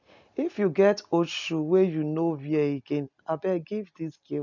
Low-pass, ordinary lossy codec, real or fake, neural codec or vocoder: 7.2 kHz; none; real; none